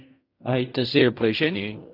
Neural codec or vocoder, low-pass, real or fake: codec, 16 kHz in and 24 kHz out, 0.4 kbps, LongCat-Audio-Codec, fine tuned four codebook decoder; 5.4 kHz; fake